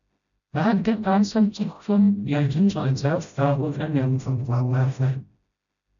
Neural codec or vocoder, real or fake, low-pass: codec, 16 kHz, 0.5 kbps, FreqCodec, smaller model; fake; 7.2 kHz